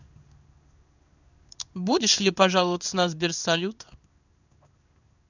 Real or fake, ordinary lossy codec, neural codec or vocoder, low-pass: fake; none; codec, 16 kHz in and 24 kHz out, 1 kbps, XY-Tokenizer; 7.2 kHz